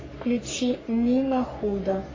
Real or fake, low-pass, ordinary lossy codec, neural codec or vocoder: fake; 7.2 kHz; AAC, 32 kbps; codec, 44.1 kHz, 3.4 kbps, Pupu-Codec